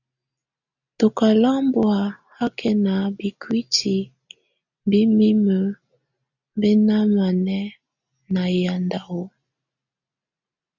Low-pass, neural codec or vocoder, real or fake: 7.2 kHz; none; real